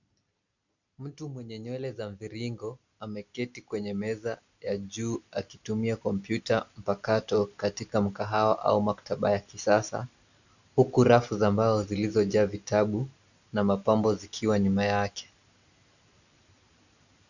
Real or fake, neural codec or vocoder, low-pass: real; none; 7.2 kHz